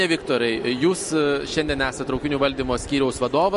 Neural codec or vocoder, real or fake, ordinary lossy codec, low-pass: none; real; MP3, 48 kbps; 14.4 kHz